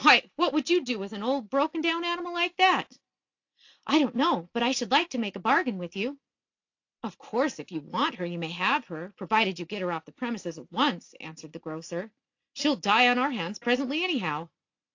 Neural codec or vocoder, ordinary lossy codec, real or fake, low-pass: none; AAC, 48 kbps; real; 7.2 kHz